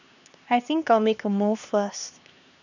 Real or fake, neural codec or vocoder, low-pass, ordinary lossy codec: fake; codec, 16 kHz, 2 kbps, X-Codec, HuBERT features, trained on LibriSpeech; 7.2 kHz; none